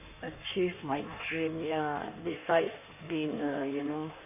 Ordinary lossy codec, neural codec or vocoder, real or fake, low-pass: none; codec, 16 kHz in and 24 kHz out, 1.1 kbps, FireRedTTS-2 codec; fake; 3.6 kHz